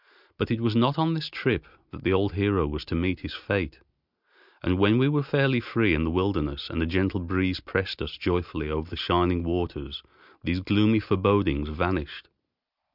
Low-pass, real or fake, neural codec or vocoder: 5.4 kHz; real; none